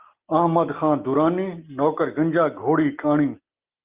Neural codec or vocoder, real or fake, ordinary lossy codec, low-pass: none; real; Opus, 32 kbps; 3.6 kHz